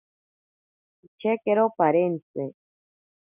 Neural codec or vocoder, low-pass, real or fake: none; 3.6 kHz; real